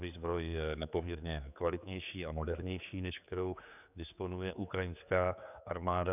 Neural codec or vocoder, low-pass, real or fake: codec, 16 kHz, 4 kbps, X-Codec, HuBERT features, trained on general audio; 3.6 kHz; fake